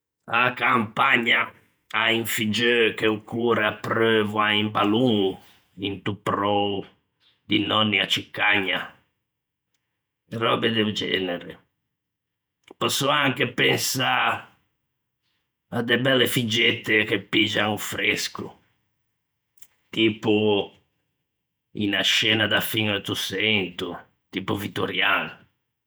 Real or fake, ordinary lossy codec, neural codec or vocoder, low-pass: fake; none; vocoder, 48 kHz, 128 mel bands, Vocos; none